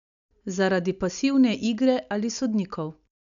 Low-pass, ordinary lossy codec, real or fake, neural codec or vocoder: 7.2 kHz; none; real; none